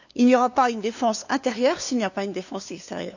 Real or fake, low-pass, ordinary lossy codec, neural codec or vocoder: fake; 7.2 kHz; none; codec, 16 kHz, 2 kbps, FunCodec, trained on LibriTTS, 25 frames a second